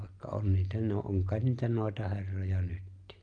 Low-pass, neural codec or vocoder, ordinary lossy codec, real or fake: 9.9 kHz; none; Opus, 32 kbps; real